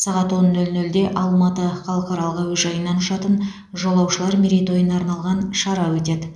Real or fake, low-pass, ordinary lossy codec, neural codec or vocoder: real; none; none; none